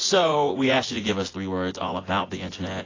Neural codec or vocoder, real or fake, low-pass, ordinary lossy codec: vocoder, 24 kHz, 100 mel bands, Vocos; fake; 7.2 kHz; AAC, 32 kbps